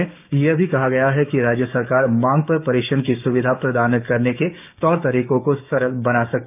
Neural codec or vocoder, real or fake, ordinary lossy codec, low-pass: codec, 16 kHz, 6 kbps, DAC; fake; none; 3.6 kHz